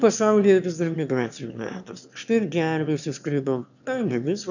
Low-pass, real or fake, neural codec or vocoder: 7.2 kHz; fake; autoencoder, 22.05 kHz, a latent of 192 numbers a frame, VITS, trained on one speaker